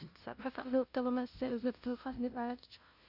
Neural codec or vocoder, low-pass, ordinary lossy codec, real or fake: codec, 16 kHz, 0.5 kbps, FunCodec, trained on LibriTTS, 25 frames a second; 5.4 kHz; Opus, 64 kbps; fake